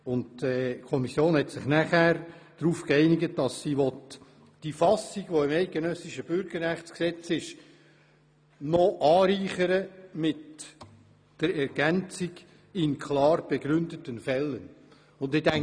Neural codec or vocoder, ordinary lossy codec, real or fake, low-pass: none; none; real; 9.9 kHz